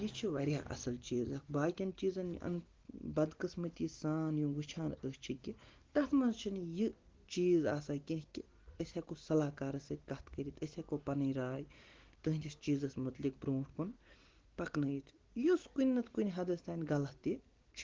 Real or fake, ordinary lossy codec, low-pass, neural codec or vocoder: real; Opus, 16 kbps; 7.2 kHz; none